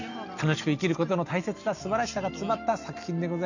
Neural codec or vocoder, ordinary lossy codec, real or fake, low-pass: none; none; real; 7.2 kHz